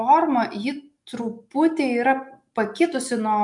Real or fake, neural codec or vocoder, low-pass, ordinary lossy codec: real; none; 10.8 kHz; MP3, 64 kbps